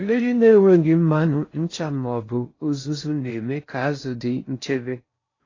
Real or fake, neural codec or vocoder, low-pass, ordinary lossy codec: fake; codec, 16 kHz in and 24 kHz out, 0.6 kbps, FocalCodec, streaming, 4096 codes; 7.2 kHz; AAC, 32 kbps